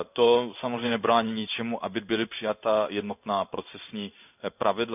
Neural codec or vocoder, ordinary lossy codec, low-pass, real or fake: codec, 16 kHz in and 24 kHz out, 1 kbps, XY-Tokenizer; none; 3.6 kHz; fake